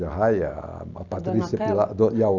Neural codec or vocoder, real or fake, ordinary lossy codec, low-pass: none; real; none; 7.2 kHz